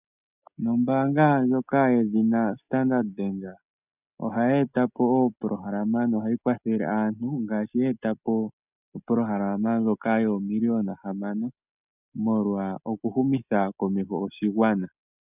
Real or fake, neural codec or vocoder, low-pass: real; none; 3.6 kHz